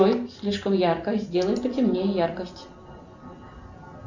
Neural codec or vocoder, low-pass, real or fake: none; 7.2 kHz; real